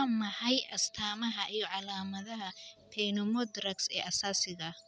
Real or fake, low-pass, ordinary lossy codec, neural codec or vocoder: real; none; none; none